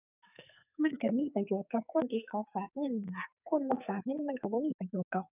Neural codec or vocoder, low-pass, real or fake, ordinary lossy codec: codec, 44.1 kHz, 2.6 kbps, SNAC; 3.6 kHz; fake; none